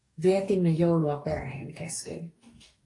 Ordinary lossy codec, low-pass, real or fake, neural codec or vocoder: AAC, 48 kbps; 10.8 kHz; fake; codec, 44.1 kHz, 2.6 kbps, DAC